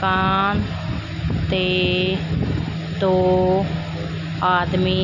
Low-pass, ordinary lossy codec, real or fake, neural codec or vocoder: 7.2 kHz; none; real; none